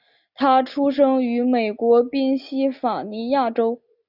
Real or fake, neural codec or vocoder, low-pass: real; none; 5.4 kHz